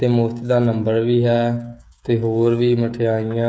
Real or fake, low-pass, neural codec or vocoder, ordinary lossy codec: fake; none; codec, 16 kHz, 16 kbps, FreqCodec, smaller model; none